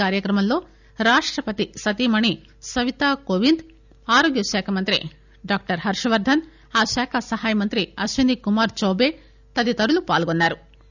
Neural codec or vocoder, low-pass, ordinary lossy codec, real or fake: none; 7.2 kHz; none; real